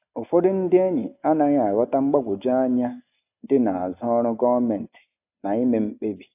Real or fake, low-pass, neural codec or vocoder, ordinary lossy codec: real; 3.6 kHz; none; AAC, 32 kbps